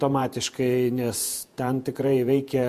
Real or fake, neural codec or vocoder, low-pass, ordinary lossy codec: fake; vocoder, 48 kHz, 128 mel bands, Vocos; 14.4 kHz; MP3, 64 kbps